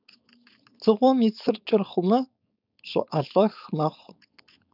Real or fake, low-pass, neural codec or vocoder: fake; 5.4 kHz; codec, 16 kHz, 4.8 kbps, FACodec